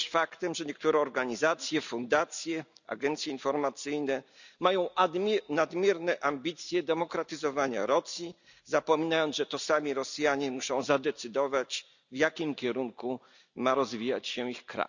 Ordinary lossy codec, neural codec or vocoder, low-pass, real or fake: none; none; 7.2 kHz; real